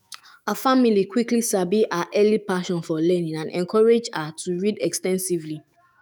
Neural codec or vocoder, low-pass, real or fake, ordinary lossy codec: autoencoder, 48 kHz, 128 numbers a frame, DAC-VAE, trained on Japanese speech; none; fake; none